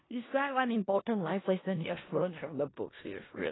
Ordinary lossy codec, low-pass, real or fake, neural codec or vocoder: AAC, 16 kbps; 7.2 kHz; fake; codec, 16 kHz in and 24 kHz out, 0.4 kbps, LongCat-Audio-Codec, four codebook decoder